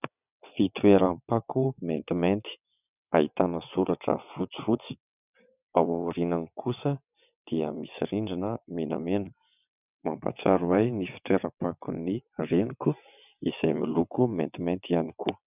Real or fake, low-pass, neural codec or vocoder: fake; 3.6 kHz; vocoder, 22.05 kHz, 80 mel bands, WaveNeXt